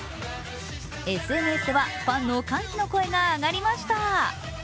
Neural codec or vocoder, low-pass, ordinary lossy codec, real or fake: none; none; none; real